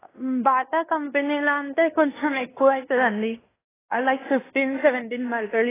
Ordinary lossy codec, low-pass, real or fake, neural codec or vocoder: AAC, 16 kbps; 3.6 kHz; fake; codec, 16 kHz in and 24 kHz out, 0.9 kbps, LongCat-Audio-Codec, fine tuned four codebook decoder